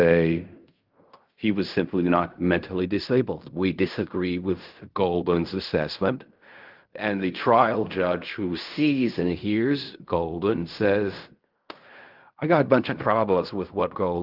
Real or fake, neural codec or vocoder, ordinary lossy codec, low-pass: fake; codec, 16 kHz in and 24 kHz out, 0.4 kbps, LongCat-Audio-Codec, fine tuned four codebook decoder; Opus, 24 kbps; 5.4 kHz